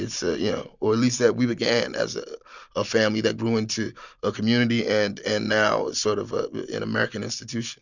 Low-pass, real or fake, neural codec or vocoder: 7.2 kHz; real; none